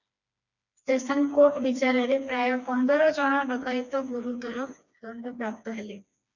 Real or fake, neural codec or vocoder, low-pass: fake; codec, 16 kHz, 2 kbps, FreqCodec, smaller model; 7.2 kHz